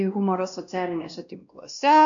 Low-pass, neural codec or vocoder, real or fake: 7.2 kHz; codec, 16 kHz, 1 kbps, X-Codec, WavLM features, trained on Multilingual LibriSpeech; fake